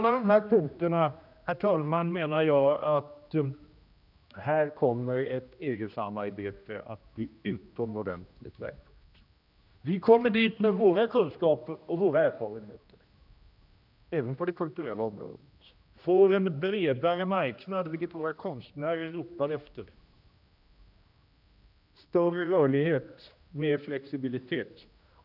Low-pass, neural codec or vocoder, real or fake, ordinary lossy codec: 5.4 kHz; codec, 16 kHz, 1 kbps, X-Codec, HuBERT features, trained on general audio; fake; none